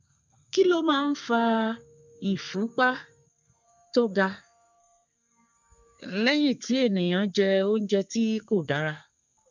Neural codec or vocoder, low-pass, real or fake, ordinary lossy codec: codec, 32 kHz, 1.9 kbps, SNAC; 7.2 kHz; fake; none